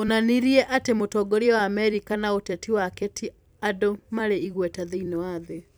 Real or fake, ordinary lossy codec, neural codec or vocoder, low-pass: fake; none; vocoder, 44.1 kHz, 128 mel bands every 256 samples, BigVGAN v2; none